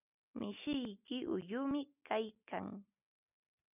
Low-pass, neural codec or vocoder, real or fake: 3.6 kHz; none; real